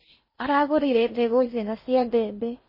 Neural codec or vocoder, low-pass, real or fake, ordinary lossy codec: codec, 16 kHz in and 24 kHz out, 0.6 kbps, FocalCodec, streaming, 4096 codes; 5.4 kHz; fake; MP3, 24 kbps